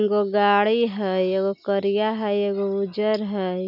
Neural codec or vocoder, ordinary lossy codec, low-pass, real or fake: none; none; 5.4 kHz; real